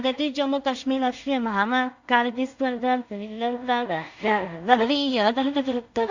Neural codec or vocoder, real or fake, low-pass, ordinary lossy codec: codec, 16 kHz in and 24 kHz out, 0.4 kbps, LongCat-Audio-Codec, two codebook decoder; fake; 7.2 kHz; Opus, 64 kbps